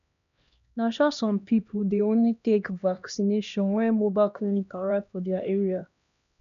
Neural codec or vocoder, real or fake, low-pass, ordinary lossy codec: codec, 16 kHz, 1 kbps, X-Codec, HuBERT features, trained on LibriSpeech; fake; 7.2 kHz; none